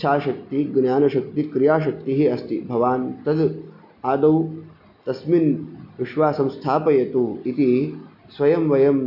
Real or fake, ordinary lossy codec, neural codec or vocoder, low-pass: real; none; none; 5.4 kHz